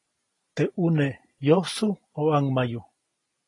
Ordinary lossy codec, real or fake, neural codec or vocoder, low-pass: AAC, 32 kbps; real; none; 10.8 kHz